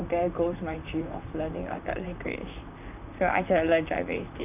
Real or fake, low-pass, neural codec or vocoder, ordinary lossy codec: fake; 3.6 kHz; vocoder, 44.1 kHz, 128 mel bands, Pupu-Vocoder; none